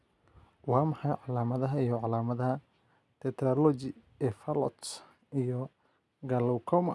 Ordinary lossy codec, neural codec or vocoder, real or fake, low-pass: none; none; real; none